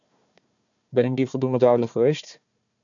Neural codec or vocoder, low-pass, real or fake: codec, 16 kHz, 1 kbps, FunCodec, trained on Chinese and English, 50 frames a second; 7.2 kHz; fake